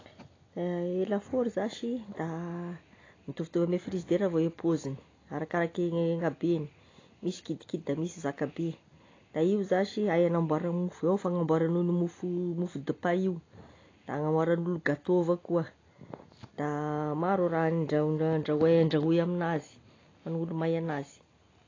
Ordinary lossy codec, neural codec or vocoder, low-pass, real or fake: AAC, 32 kbps; none; 7.2 kHz; real